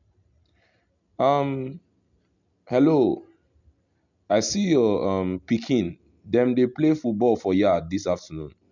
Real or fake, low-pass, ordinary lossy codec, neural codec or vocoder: real; 7.2 kHz; none; none